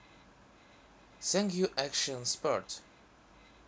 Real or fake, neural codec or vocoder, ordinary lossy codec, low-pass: real; none; none; none